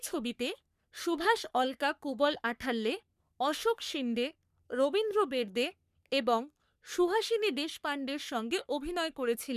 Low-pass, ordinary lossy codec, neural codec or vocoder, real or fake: 14.4 kHz; none; codec, 44.1 kHz, 3.4 kbps, Pupu-Codec; fake